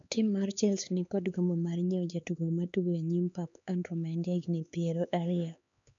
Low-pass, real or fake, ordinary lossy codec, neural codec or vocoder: 7.2 kHz; fake; MP3, 64 kbps; codec, 16 kHz, 4 kbps, X-Codec, HuBERT features, trained on LibriSpeech